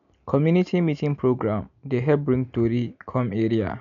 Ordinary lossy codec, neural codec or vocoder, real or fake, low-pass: none; none; real; 7.2 kHz